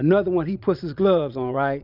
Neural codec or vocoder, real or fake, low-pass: vocoder, 22.05 kHz, 80 mel bands, Vocos; fake; 5.4 kHz